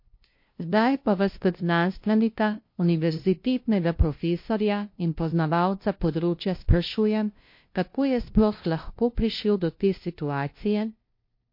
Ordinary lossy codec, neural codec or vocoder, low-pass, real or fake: MP3, 32 kbps; codec, 16 kHz, 0.5 kbps, FunCodec, trained on LibriTTS, 25 frames a second; 5.4 kHz; fake